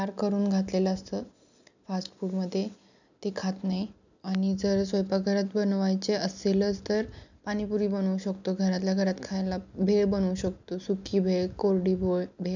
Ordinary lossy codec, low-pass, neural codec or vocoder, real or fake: none; 7.2 kHz; none; real